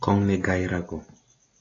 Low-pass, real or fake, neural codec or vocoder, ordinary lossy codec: 7.2 kHz; real; none; AAC, 32 kbps